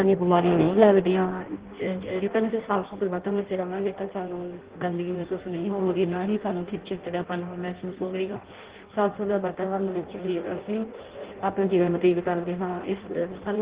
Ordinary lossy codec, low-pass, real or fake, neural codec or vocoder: Opus, 16 kbps; 3.6 kHz; fake; codec, 16 kHz in and 24 kHz out, 0.6 kbps, FireRedTTS-2 codec